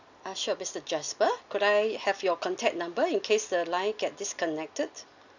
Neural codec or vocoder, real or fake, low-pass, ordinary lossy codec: none; real; 7.2 kHz; none